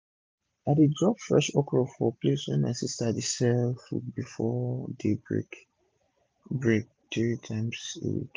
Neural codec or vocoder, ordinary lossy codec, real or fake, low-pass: none; none; real; none